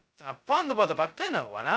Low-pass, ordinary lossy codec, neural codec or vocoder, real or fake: none; none; codec, 16 kHz, 0.2 kbps, FocalCodec; fake